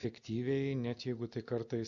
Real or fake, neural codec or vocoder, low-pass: real; none; 7.2 kHz